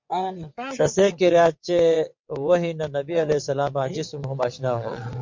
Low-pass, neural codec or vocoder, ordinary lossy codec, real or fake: 7.2 kHz; vocoder, 22.05 kHz, 80 mel bands, WaveNeXt; MP3, 48 kbps; fake